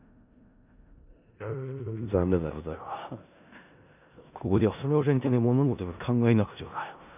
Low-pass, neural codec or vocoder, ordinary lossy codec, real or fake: 3.6 kHz; codec, 16 kHz in and 24 kHz out, 0.4 kbps, LongCat-Audio-Codec, four codebook decoder; none; fake